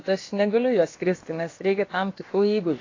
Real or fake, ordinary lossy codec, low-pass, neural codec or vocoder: fake; AAC, 32 kbps; 7.2 kHz; codec, 16 kHz, about 1 kbps, DyCAST, with the encoder's durations